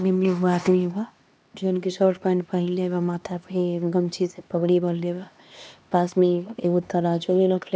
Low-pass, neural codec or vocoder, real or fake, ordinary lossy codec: none; codec, 16 kHz, 2 kbps, X-Codec, HuBERT features, trained on LibriSpeech; fake; none